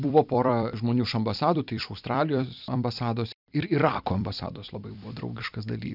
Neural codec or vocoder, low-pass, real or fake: vocoder, 44.1 kHz, 128 mel bands every 256 samples, BigVGAN v2; 5.4 kHz; fake